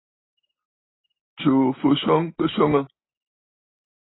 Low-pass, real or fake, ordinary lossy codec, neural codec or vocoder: 7.2 kHz; real; AAC, 16 kbps; none